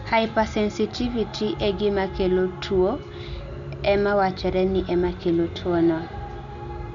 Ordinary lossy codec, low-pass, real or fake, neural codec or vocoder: MP3, 96 kbps; 7.2 kHz; real; none